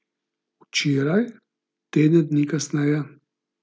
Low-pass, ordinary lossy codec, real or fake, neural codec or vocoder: none; none; real; none